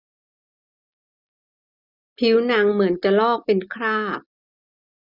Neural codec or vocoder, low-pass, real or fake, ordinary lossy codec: none; 5.4 kHz; real; none